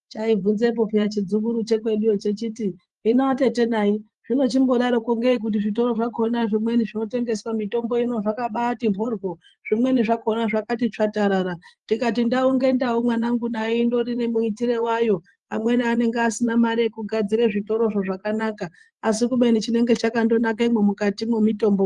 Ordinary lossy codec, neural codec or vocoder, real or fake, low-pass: Opus, 24 kbps; vocoder, 24 kHz, 100 mel bands, Vocos; fake; 10.8 kHz